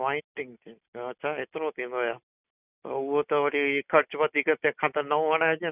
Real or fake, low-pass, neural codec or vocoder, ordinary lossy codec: real; 3.6 kHz; none; none